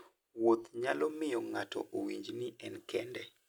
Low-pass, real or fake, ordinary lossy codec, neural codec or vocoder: none; real; none; none